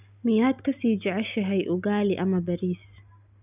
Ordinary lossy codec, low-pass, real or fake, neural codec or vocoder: none; 3.6 kHz; real; none